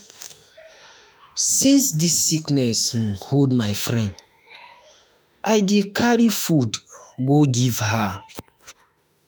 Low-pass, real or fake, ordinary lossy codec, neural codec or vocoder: none; fake; none; autoencoder, 48 kHz, 32 numbers a frame, DAC-VAE, trained on Japanese speech